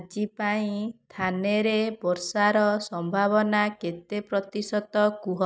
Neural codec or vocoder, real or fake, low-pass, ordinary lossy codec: none; real; none; none